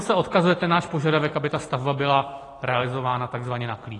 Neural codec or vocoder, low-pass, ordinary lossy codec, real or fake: vocoder, 44.1 kHz, 128 mel bands every 256 samples, BigVGAN v2; 10.8 kHz; AAC, 32 kbps; fake